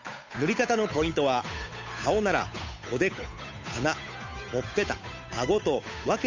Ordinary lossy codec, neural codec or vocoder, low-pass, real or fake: MP3, 64 kbps; codec, 16 kHz, 8 kbps, FunCodec, trained on Chinese and English, 25 frames a second; 7.2 kHz; fake